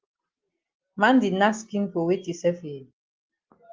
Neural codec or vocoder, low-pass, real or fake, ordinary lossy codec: none; 7.2 kHz; real; Opus, 32 kbps